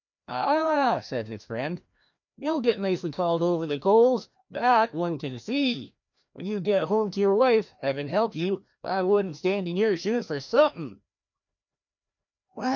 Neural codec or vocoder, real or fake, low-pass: codec, 16 kHz, 1 kbps, FreqCodec, larger model; fake; 7.2 kHz